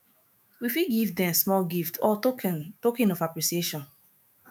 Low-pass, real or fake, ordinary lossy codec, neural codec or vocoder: none; fake; none; autoencoder, 48 kHz, 128 numbers a frame, DAC-VAE, trained on Japanese speech